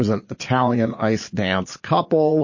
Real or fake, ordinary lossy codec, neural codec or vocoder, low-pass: fake; MP3, 32 kbps; vocoder, 22.05 kHz, 80 mel bands, Vocos; 7.2 kHz